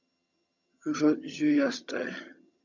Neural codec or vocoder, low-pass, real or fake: vocoder, 22.05 kHz, 80 mel bands, HiFi-GAN; 7.2 kHz; fake